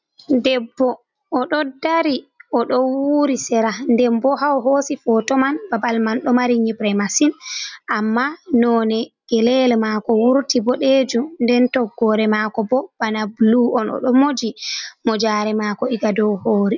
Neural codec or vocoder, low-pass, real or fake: none; 7.2 kHz; real